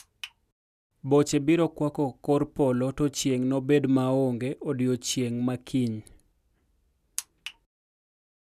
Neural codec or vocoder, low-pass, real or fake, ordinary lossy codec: none; 14.4 kHz; real; none